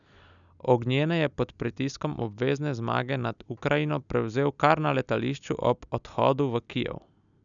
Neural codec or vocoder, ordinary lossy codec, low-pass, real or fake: none; none; 7.2 kHz; real